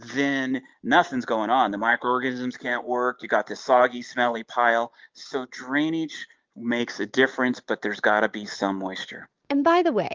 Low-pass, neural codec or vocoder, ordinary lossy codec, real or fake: 7.2 kHz; none; Opus, 32 kbps; real